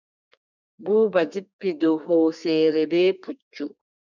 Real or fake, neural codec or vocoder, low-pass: fake; codec, 32 kHz, 1.9 kbps, SNAC; 7.2 kHz